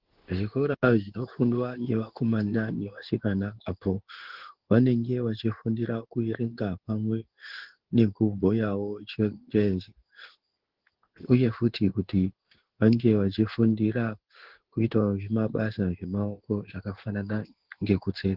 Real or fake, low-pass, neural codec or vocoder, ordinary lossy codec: fake; 5.4 kHz; codec, 16 kHz in and 24 kHz out, 1 kbps, XY-Tokenizer; Opus, 16 kbps